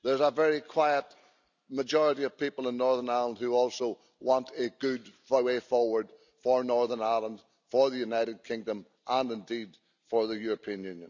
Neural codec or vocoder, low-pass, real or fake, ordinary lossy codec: none; 7.2 kHz; real; none